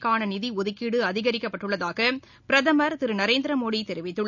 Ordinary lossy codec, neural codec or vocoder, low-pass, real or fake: none; none; 7.2 kHz; real